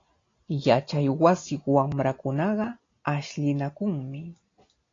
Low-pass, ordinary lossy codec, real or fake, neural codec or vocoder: 7.2 kHz; AAC, 32 kbps; real; none